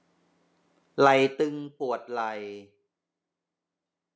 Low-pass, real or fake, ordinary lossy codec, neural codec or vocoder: none; real; none; none